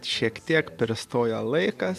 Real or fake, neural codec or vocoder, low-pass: real; none; 14.4 kHz